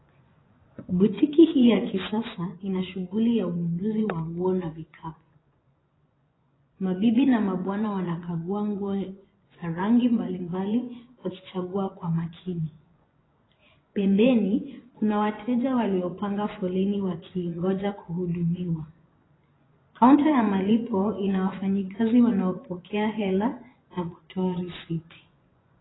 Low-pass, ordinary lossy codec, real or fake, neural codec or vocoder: 7.2 kHz; AAC, 16 kbps; fake; vocoder, 24 kHz, 100 mel bands, Vocos